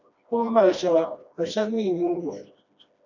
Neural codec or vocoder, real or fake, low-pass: codec, 16 kHz, 1 kbps, FreqCodec, smaller model; fake; 7.2 kHz